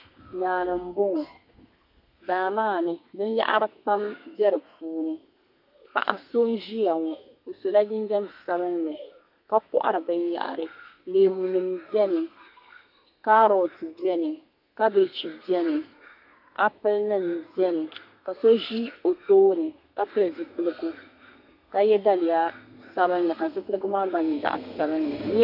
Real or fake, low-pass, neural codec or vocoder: fake; 5.4 kHz; codec, 32 kHz, 1.9 kbps, SNAC